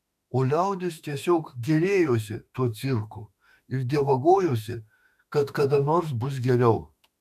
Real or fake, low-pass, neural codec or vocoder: fake; 14.4 kHz; autoencoder, 48 kHz, 32 numbers a frame, DAC-VAE, trained on Japanese speech